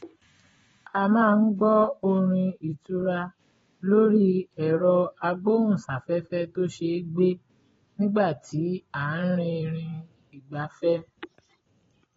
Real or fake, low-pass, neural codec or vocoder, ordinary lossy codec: fake; 10.8 kHz; vocoder, 24 kHz, 100 mel bands, Vocos; AAC, 24 kbps